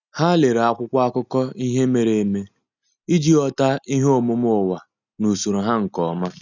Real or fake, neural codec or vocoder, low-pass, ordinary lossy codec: real; none; 7.2 kHz; none